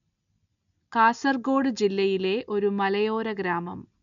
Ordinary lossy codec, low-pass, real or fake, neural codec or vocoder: none; 7.2 kHz; real; none